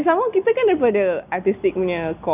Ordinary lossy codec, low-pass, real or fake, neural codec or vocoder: none; 3.6 kHz; real; none